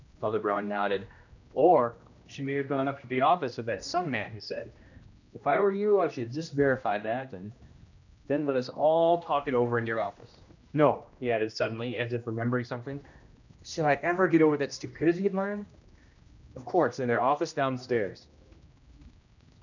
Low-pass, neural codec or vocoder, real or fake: 7.2 kHz; codec, 16 kHz, 1 kbps, X-Codec, HuBERT features, trained on general audio; fake